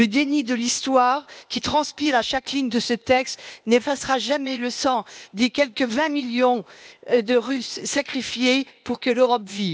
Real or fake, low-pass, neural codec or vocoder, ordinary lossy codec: fake; none; codec, 16 kHz, 0.8 kbps, ZipCodec; none